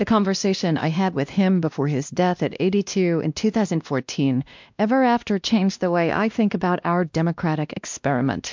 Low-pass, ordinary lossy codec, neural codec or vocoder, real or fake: 7.2 kHz; MP3, 64 kbps; codec, 16 kHz, 1 kbps, X-Codec, WavLM features, trained on Multilingual LibriSpeech; fake